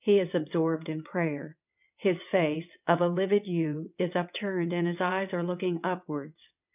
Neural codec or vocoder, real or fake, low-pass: vocoder, 22.05 kHz, 80 mel bands, WaveNeXt; fake; 3.6 kHz